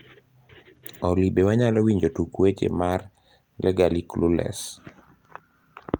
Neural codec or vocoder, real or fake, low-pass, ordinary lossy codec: none; real; 19.8 kHz; Opus, 32 kbps